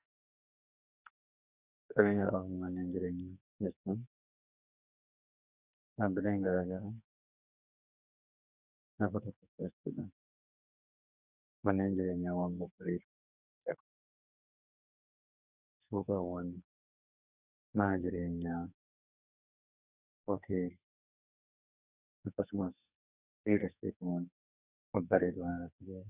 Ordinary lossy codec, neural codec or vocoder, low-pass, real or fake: Opus, 64 kbps; codec, 44.1 kHz, 2.6 kbps, SNAC; 3.6 kHz; fake